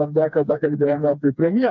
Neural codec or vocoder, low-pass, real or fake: codec, 16 kHz, 2 kbps, FreqCodec, smaller model; 7.2 kHz; fake